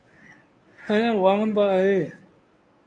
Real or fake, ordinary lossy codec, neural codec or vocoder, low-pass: fake; MP3, 64 kbps; codec, 24 kHz, 0.9 kbps, WavTokenizer, medium speech release version 1; 9.9 kHz